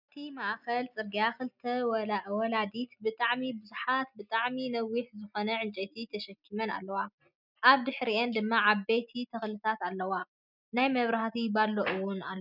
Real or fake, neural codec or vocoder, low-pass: real; none; 5.4 kHz